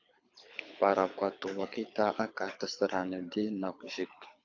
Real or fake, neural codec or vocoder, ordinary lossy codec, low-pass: fake; codec, 24 kHz, 6 kbps, HILCodec; AAC, 48 kbps; 7.2 kHz